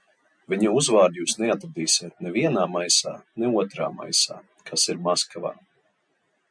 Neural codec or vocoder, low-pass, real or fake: none; 9.9 kHz; real